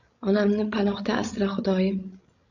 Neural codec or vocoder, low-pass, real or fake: codec, 16 kHz, 16 kbps, FreqCodec, larger model; 7.2 kHz; fake